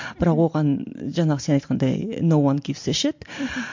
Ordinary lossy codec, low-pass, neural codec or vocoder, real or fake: MP3, 48 kbps; 7.2 kHz; none; real